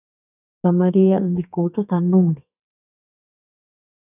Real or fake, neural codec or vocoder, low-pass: fake; codec, 32 kHz, 1.9 kbps, SNAC; 3.6 kHz